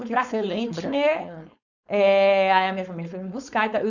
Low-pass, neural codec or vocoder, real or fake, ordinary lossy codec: 7.2 kHz; codec, 16 kHz, 4.8 kbps, FACodec; fake; none